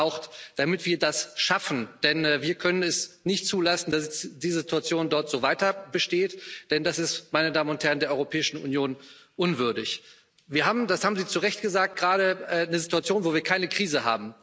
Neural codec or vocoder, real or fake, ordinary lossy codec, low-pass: none; real; none; none